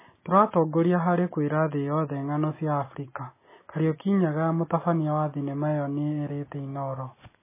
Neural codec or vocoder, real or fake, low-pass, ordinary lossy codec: none; real; 3.6 kHz; MP3, 16 kbps